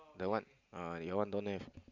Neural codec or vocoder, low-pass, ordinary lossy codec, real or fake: none; 7.2 kHz; Opus, 64 kbps; real